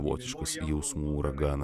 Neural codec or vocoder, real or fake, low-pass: none; real; 14.4 kHz